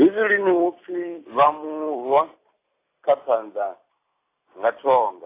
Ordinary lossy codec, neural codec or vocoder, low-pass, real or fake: AAC, 24 kbps; none; 3.6 kHz; real